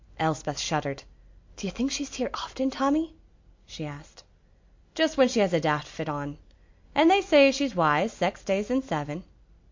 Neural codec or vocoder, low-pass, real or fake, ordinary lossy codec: none; 7.2 kHz; real; MP3, 48 kbps